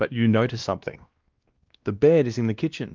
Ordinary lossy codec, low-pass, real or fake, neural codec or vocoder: Opus, 32 kbps; 7.2 kHz; fake; codec, 16 kHz, 1 kbps, X-Codec, WavLM features, trained on Multilingual LibriSpeech